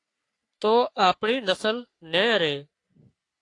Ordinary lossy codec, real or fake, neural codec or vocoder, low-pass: AAC, 48 kbps; fake; codec, 44.1 kHz, 3.4 kbps, Pupu-Codec; 10.8 kHz